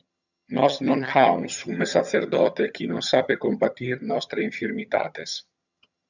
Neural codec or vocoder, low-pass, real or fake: vocoder, 22.05 kHz, 80 mel bands, HiFi-GAN; 7.2 kHz; fake